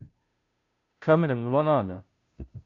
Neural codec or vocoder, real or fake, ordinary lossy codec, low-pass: codec, 16 kHz, 0.5 kbps, FunCodec, trained on Chinese and English, 25 frames a second; fake; MP3, 48 kbps; 7.2 kHz